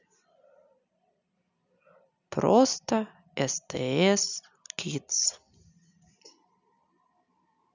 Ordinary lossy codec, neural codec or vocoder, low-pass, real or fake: none; none; 7.2 kHz; real